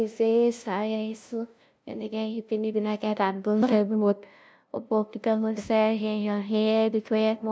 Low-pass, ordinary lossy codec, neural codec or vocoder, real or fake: none; none; codec, 16 kHz, 0.5 kbps, FunCodec, trained on LibriTTS, 25 frames a second; fake